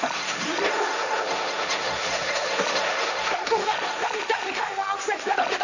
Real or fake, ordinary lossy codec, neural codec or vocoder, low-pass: fake; none; codec, 16 kHz, 1.1 kbps, Voila-Tokenizer; 7.2 kHz